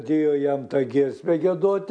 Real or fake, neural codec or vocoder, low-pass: real; none; 9.9 kHz